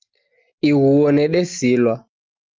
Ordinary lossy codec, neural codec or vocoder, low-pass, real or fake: Opus, 32 kbps; none; 7.2 kHz; real